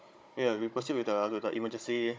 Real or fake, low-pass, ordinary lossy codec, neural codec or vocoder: fake; none; none; codec, 16 kHz, 16 kbps, FunCodec, trained on Chinese and English, 50 frames a second